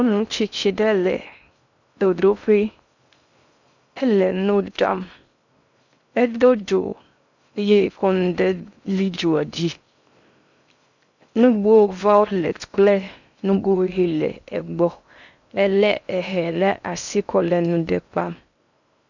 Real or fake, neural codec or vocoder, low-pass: fake; codec, 16 kHz in and 24 kHz out, 0.6 kbps, FocalCodec, streaming, 2048 codes; 7.2 kHz